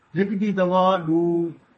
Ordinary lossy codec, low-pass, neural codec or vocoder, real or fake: MP3, 32 kbps; 10.8 kHz; codec, 32 kHz, 1.9 kbps, SNAC; fake